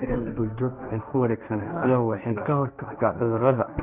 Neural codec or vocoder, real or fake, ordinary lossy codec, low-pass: codec, 16 kHz, 1.1 kbps, Voila-Tokenizer; fake; none; 3.6 kHz